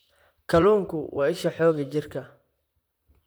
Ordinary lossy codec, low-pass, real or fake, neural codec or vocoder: none; none; fake; vocoder, 44.1 kHz, 128 mel bands, Pupu-Vocoder